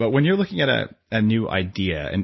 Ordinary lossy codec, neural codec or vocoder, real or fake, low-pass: MP3, 24 kbps; none; real; 7.2 kHz